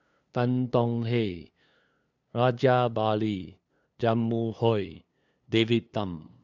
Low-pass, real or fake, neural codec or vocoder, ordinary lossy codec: 7.2 kHz; fake; codec, 16 kHz, 2 kbps, FunCodec, trained on LibriTTS, 25 frames a second; none